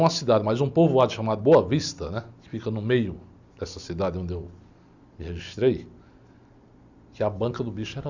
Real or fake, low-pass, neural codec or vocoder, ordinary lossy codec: real; 7.2 kHz; none; Opus, 64 kbps